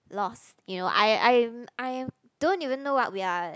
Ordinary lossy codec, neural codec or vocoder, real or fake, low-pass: none; none; real; none